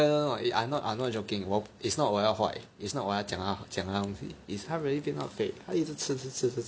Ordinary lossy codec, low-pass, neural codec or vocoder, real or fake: none; none; none; real